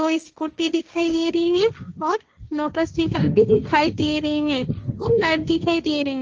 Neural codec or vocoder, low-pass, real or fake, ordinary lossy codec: codec, 16 kHz, 1.1 kbps, Voila-Tokenizer; 7.2 kHz; fake; Opus, 24 kbps